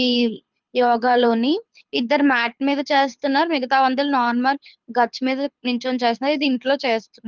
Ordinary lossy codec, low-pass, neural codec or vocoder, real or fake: Opus, 32 kbps; 7.2 kHz; codec, 24 kHz, 6 kbps, HILCodec; fake